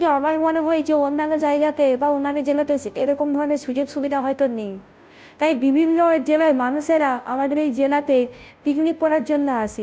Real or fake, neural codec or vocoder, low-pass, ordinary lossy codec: fake; codec, 16 kHz, 0.5 kbps, FunCodec, trained on Chinese and English, 25 frames a second; none; none